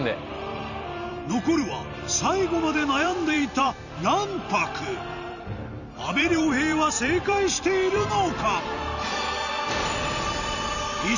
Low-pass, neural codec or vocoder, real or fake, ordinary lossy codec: 7.2 kHz; vocoder, 44.1 kHz, 128 mel bands every 256 samples, BigVGAN v2; fake; none